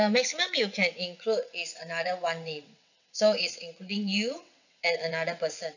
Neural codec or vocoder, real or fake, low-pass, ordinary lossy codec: vocoder, 22.05 kHz, 80 mel bands, WaveNeXt; fake; 7.2 kHz; none